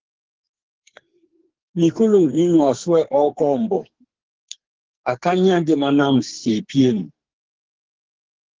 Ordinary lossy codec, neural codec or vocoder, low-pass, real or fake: Opus, 16 kbps; codec, 44.1 kHz, 2.6 kbps, SNAC; 7.2 kHz; fake